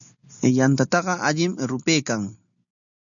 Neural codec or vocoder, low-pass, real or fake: none; 7.2 kHz; real